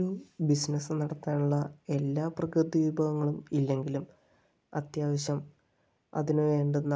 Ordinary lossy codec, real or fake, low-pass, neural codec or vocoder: none; real; none; none